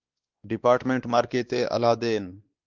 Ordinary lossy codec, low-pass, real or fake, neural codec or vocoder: Opus, 32 kbps; 7.2 kHz; fake; codec, 16 kHz, 2 kbps, X-Codec, WavLM features, trained on Multilingual LibriSpeech